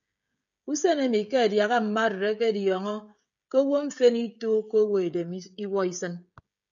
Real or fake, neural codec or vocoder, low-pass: fake; codec, 16 kHz, 16 kbps, FreqCodec, smaller model; 7.2 kHz